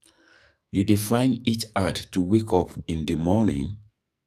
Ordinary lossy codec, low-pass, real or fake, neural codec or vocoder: none; 14.4 kHz; fake; codec, 44.1 kHz, 2.6 kbps, SNAC